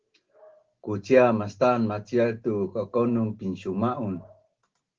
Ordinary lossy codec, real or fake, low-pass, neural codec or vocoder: Opus, 16 kbps; real; 7.2 kHz; none